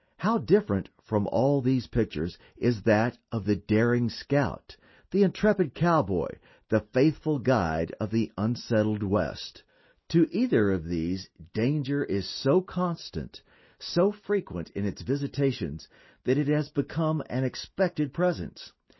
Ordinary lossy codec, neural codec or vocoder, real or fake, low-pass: MP3, 24 kbps; none; real; 7.2 kHz